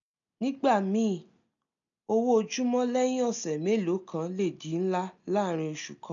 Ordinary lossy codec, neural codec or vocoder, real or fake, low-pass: MP3, 96 kbps; none; real; 7.2 kHz